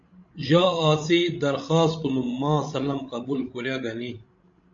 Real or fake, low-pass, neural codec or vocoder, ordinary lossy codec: fake; 7.2 kHz; codec, 16 kHz, 16 kbps, FreqCodec, larger model; MP3, 48 kbps